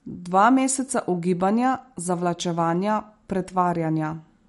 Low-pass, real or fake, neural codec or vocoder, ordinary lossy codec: 14.4 kHz; real; none; MP3, 48 kbps